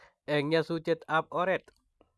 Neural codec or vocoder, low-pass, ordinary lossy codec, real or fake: none; none; none; real